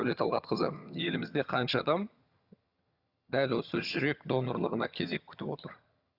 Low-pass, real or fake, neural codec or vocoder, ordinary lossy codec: 5.4 kHz; fake; vocoder, 22.05 kHz, 80 mel bands, HiFi-GAN; Opus, 64 kbps